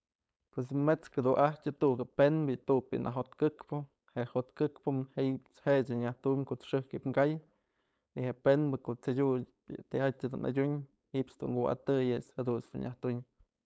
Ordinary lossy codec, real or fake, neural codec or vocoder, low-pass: none; fake; codec, 16 kHz, 4.8 kbps, FACodec; none